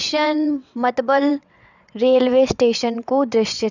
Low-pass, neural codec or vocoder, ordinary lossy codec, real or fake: 7.2 kHz; vocoder, 22.05 kHz, 80 mel bands, WaveNeXt; none; fake